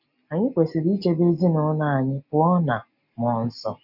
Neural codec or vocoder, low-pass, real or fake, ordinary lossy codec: none; 5.4 kHz; real; none